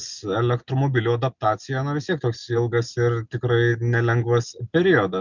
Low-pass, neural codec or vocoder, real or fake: 7.2 kHz; none; real